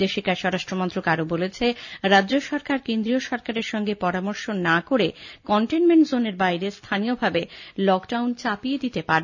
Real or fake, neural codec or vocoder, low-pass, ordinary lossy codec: real; none; 7.2 kHz; none